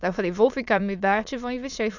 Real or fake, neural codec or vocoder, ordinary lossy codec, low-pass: fake; autoencoder, 22.05 kHz, a latent of 192 numbers a frame, VITS, trained on many speakers; none; 7.2 kHz